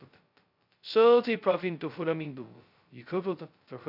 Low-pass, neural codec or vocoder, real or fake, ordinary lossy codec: 5.4 kHz; codec, 16 kHz, 0.2 kbps, FocalCodec; fake; none